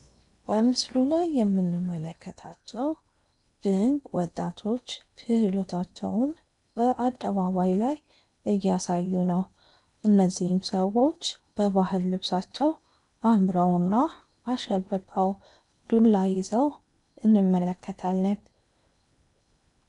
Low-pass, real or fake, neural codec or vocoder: 10.8 kHz; fake; codec, 16 kHz in and 24 kHz out, 0.8 kbps, FocalCodec, streaming, 65536 codes